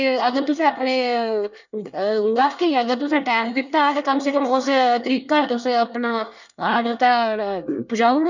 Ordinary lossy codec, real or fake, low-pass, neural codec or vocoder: none; fake; 7.2 kHz; codec, 24 kHz, 1 kbps, SNAC